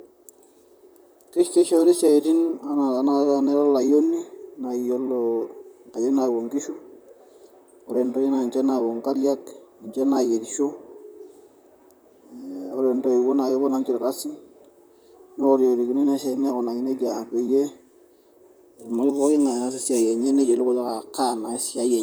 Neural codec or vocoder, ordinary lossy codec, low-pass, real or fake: vocoder, 44.1 kHz, 128 mel bands, Pupu-Vocoder; none; none; fake